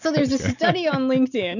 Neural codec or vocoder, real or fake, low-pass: none; real; 7.2 kHz